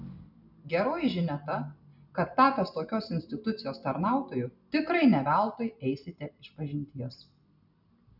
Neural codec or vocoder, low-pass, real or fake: none; 5.4 kHz; real